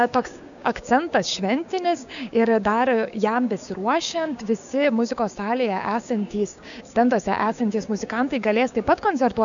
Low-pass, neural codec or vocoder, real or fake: 7.2 kHz; codec, 16 kHz, 6 kbps, DAC; fake